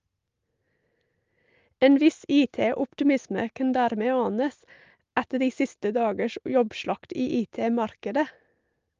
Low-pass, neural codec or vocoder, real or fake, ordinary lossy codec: 7.2 kHz; none; real; Opus, 32 kbps